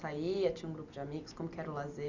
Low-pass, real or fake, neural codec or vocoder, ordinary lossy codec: 7.2 kHz; real; none; Opus, 64 kbps